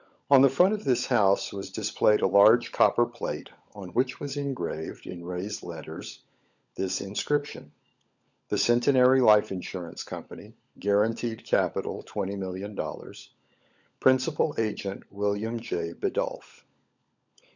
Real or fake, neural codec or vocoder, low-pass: fake; codec, 16 kHz, 16 kbps, FunCodec, trained on LibriTTS, 50 frames a second; 7.2 kHz